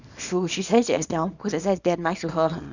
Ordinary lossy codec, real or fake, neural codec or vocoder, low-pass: none; fake; codec, 24 kHz, 0.9 kbps, WavTokenizer, small release; 7.2 kHz